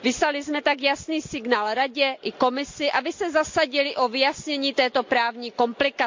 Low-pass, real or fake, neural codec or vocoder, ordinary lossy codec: 7.2 kHz; real; none; MP3, 48 kbps